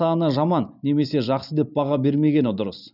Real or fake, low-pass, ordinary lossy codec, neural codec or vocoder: real; 5.4 kHz; none; none